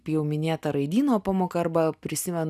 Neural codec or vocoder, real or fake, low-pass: none; real; 14.4 kHz